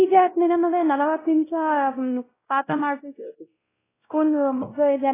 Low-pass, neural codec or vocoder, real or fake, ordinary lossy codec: 3.6 kHz; codec, 16 kHz, 0.5 kbps, X-Codec, WavLM features, trained on Multilingual LibriSpeech; fake; AAC, 16 kbps